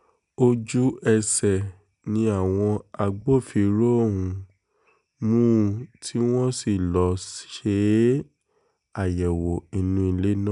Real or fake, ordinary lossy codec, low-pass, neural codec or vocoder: real; none; 10.8 kHz; none